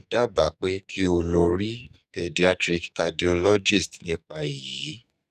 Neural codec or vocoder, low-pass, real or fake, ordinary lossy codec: codec, 44.1 kHz, 2.6 kbps, SNAC; 14.4 kHz; fake; none